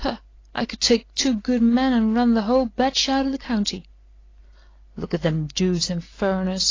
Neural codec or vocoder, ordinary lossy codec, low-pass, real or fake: none; AAC, 32 kbps; 7.2 kHz; real